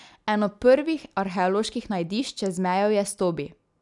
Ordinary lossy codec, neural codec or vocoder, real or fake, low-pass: none; none; real; 10.8 kHz